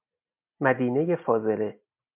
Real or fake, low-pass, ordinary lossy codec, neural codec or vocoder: real; 3.6 kHz; MP3, 32 kbps; none